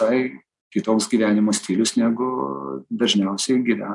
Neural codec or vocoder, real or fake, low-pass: none; real; 10.8 kHz